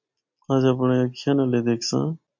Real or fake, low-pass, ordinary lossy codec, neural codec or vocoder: real; 7.2 kHz; MP3, 48 kbps; none